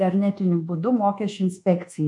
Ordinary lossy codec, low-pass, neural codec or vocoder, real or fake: MP3, 64 kbps; 10.8 kHz; codec, 24 kHz, 1.2 kbps, DualCodec; fake